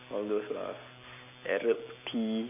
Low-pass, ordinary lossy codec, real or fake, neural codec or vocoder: 3.6 kHz; none; real; none